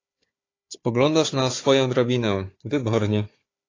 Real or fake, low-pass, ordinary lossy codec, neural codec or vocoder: fake; 7.2 kHz; AAC, 32 kbps; codec, 16 kHz, 4 kbps, FunCodec, trained on Chinese and English, 50 frames a second